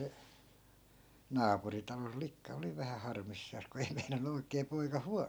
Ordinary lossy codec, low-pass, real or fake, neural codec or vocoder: none; none; real; none